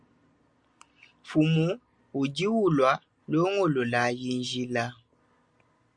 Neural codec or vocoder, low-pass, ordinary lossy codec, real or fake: none; 9.9 kHz; Opus, 64 kbps; real